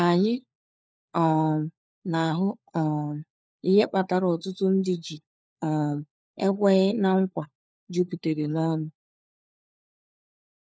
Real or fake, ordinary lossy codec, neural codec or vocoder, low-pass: fake; none; codec, 16 kHz, 4 kbps, FunCodec, trained on LibriTTS, 50 frames a second; none